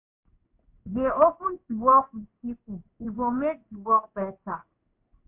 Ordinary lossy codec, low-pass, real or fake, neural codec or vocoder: MP3, 32 kbps; 3.6 kHz; fake; codec, 16 kHz in and 24 kHz out, 1 kbps, XY-Tokenizer